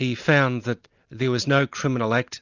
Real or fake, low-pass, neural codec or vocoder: real; 7.2 kHz; none